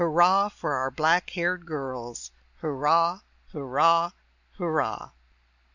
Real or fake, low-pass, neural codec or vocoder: real; 7.2 kHz; none